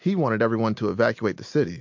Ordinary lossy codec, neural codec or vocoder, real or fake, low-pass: MP3, 48 kbps; none; real; 7.2 kHz